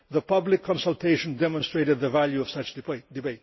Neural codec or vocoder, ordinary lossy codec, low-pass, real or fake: none; MP3, 24 kbps; 7.2 kHz; real